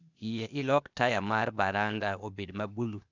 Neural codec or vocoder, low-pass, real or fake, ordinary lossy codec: codec, 16 kHz, 0.8 kbps, ZipCodec; 7.2 kHz; fake; none